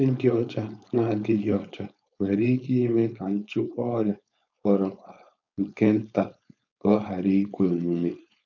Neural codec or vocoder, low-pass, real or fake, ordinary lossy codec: codec, 16 kHz, 4.8 kbps, FACodec; 7.2 kHz; fake; none